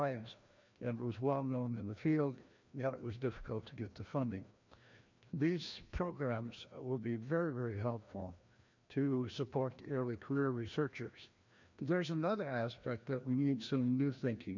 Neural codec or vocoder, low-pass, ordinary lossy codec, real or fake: codec, 16 kHz, 1 kbps, FreqCodec, larger model; 7.2 kHz; MP3, 48 kbps; fake